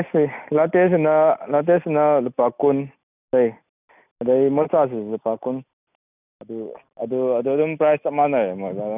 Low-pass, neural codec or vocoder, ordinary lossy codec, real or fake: 3.6 kHz; none; none; real